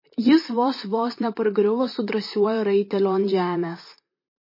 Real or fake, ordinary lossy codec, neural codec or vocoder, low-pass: fake; MP3, 24 kbps; vocoder, 44.1 kHz, 128 mel bands, Pupu-Vocoder; 5.4 kHz